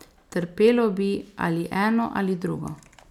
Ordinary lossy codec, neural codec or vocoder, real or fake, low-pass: none; none; real; 19.8 kHz